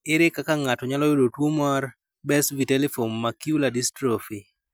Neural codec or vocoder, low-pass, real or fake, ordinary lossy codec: none; none; real; none